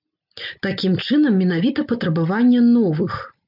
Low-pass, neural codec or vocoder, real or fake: 5.4 kHz; none; real